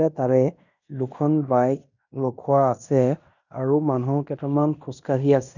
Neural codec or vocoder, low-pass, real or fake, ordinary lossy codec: codec, 16 kHz in and 24 kHz out, 0.9 kbps, LongCat-Audio-Codec, fine tuned four codebook decoder; 7.2 kHz; fake; none